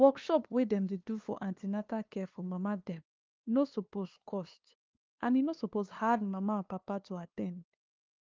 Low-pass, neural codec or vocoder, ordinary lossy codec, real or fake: 7.2 kHz; codec, 16 kHz, 2 kbps, FunCodec, trained on LibriTTS, 25 frames a second; Opus, 32 kbps; fake